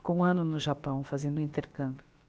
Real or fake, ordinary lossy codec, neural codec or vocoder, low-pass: fake; none; codec, 16 kHz, about 1 kbps, DyCAST, with the encoder's durations; none